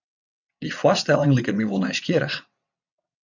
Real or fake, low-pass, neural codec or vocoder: fake; 7.2 kHz; vocoder, 22.05 kHz, 80 mel bands, WaveNeXt